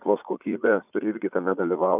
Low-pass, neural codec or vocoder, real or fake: 3.6 kHz; vocoder, 44.1 kHz, 80 mel bands, Vocos; fake